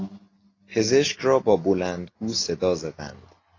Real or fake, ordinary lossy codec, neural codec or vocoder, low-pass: real; AAC, 32 kbps; none; 7.2 kHz